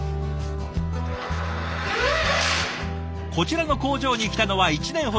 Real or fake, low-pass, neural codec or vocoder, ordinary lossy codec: real; none; none; none